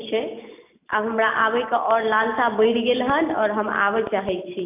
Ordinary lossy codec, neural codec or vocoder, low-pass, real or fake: AAC, 32 kbps; none; 3.6 kHz; real